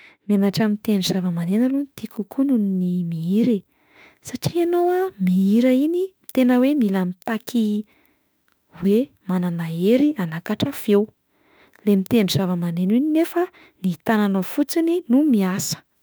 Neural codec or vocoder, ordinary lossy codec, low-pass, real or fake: autoencoder, 48 kHz, 32 numbers a frame, DAC-VAE, trained on Japanese speech; none; none; fake